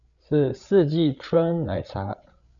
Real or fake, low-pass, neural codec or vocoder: fake; 7.2 kHz; codec, 16 kHz, 8 kbps, FreqCodec, larger model